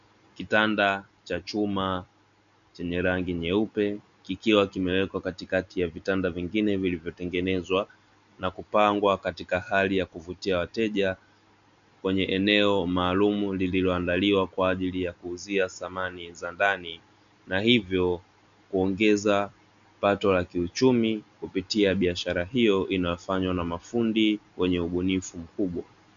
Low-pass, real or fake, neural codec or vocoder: 7.2 kHz; real; none